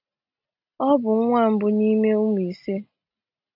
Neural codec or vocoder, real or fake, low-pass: none; real; 5.4 kHz